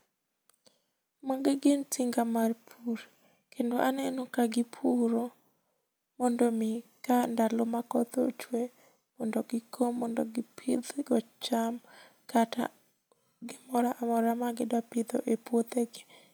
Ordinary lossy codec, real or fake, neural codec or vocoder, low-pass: none; real; none; none